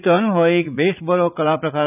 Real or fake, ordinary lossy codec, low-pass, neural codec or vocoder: fake; none; 3.6 kHz; vocoder, 44.1 kHz, 80 mel bands, Vocos